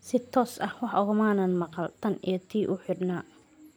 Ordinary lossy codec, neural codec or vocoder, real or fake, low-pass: none; none; real; none